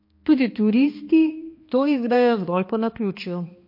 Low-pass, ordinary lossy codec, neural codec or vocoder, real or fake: 5.4 kHz; MP3, 32 kbps; codec, 16 kHz, 2 kbps, X-Codec, HuBERT features, trained on balanced general audio; fake